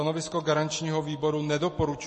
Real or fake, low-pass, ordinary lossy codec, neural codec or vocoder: real; 10.8 kHz; MP3, 32 kbps; none